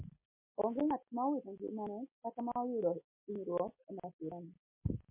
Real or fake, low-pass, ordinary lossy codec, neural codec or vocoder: real; 3.6 kHz; MP3, 24 kbps; none